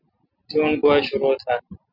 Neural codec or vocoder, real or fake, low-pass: none; real; 5.4 kHz